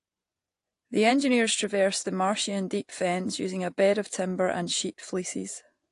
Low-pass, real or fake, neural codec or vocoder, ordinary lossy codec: 10.8 kHz; fake; vocoder, 24 kHz, 100 mel bands, Vocos; AAC, 48 kbps